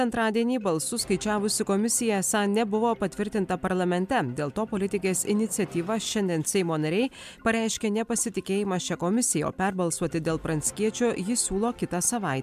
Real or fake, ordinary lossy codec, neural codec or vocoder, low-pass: real; MP3, 96 kbps; none; 14.4 kHz